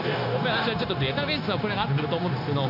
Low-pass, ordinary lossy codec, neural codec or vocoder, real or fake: 5.4 kHz; none; codec, 16 kHz, 0.9 kbps, LongCat-Audio-Codec; fake